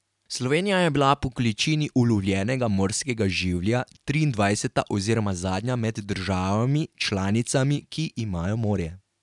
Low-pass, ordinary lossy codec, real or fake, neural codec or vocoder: 10.8 kHz; none; real; none